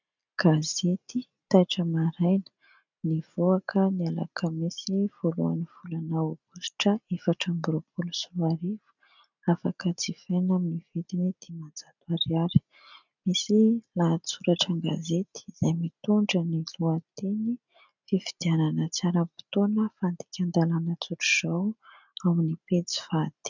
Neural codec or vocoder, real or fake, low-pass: none; real; 7.2 kHz